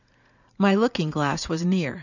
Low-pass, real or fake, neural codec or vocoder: 7.2 kHz; fake; vocoder, 44.1 kHz, 80 mel bands, Vocos